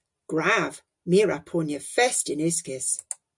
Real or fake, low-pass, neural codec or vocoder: real; 10.8 kHz; none